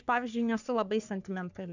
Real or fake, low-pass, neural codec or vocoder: fake; 7.2 kHz; codec, 44.1 kHz, 3.4 kbps, Pupu-Codec